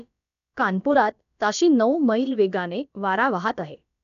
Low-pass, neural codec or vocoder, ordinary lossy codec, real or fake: 7.2 kHz; codec, 16 kHz, about 1 kbps, DyCAST, with the encoder's durations; none; fake